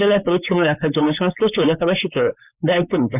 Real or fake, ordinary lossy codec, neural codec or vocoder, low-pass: fake; none; codec, 16 kHz, 4.8 kbps, FACodec; 3.6 kHz